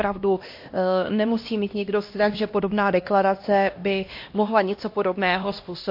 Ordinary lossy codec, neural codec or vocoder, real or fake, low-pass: MP3, 32 kbps; codec, 16 kHz, 1 kbps, X-Codec, HuBERT features, trained on LibriSpeech; fake; 5.4 kHz